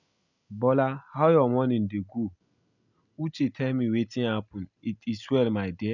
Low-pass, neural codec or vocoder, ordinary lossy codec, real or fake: 7.2 kHz; none; none; real